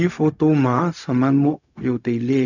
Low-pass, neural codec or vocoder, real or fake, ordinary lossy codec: 7.2 kHz; codec, 16 kHz, 0.4 kbps, LongCat-Audio-Codec; fake; none